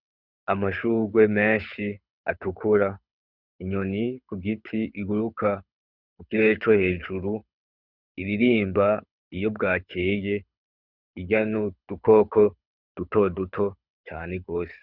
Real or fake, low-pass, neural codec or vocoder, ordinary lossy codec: fake; 5.4 kHz; codec, 24 kHz, 6 kbps, HILCodec; Opus, 64 kbps